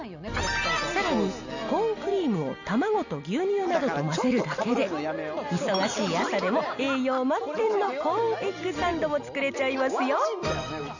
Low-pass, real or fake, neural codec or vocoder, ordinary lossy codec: 7.2 kHz; real; none; none